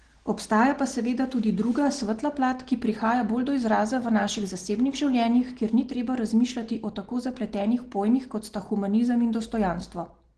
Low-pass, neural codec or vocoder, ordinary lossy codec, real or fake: 9.9 kHz; none; Opus, 16 kbps; real